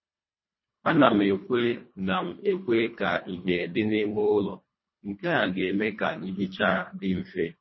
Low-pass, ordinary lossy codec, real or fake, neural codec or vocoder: 7.2 kHz; MP3, 24 kbps; fake; codec, 24 kHz, 1.5 kbps, HILCodec